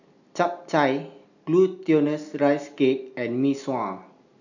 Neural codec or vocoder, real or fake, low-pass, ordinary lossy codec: none; real; 7.2 kHz; none